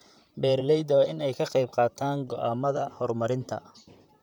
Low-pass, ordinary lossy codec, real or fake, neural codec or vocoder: 19.8 kHz; none; fake; vocoder, 44.1 kHz, 128 mel bands, Pupu-Vocoder